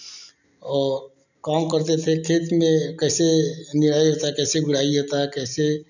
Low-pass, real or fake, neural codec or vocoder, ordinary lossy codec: 7.2 kHz; real; none; none